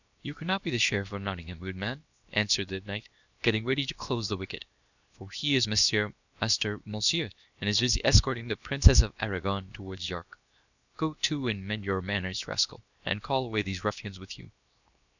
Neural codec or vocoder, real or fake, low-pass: codec, 16 kHz, 0.7 kbps, FocalCodec; fake; 7.2 kHz